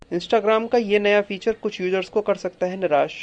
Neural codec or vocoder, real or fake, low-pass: none; real; 9.9 kHz